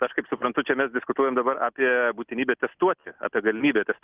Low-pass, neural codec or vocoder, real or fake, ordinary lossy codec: 3.6 kHz; none; real; Opus, 24 kbps